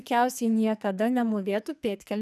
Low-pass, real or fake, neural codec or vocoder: 14.4 kHz; fake; codec, 44.1 kHz, 2.6 kbps, SNAC